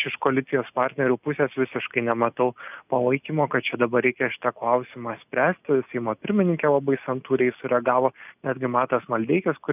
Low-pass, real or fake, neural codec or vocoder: 3.6 kHz; real; none